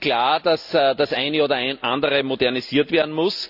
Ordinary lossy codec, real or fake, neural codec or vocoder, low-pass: none; real; none; 5.4 kHz